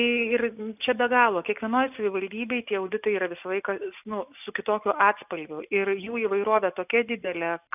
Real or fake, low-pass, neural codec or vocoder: real; 3.6 kHz; none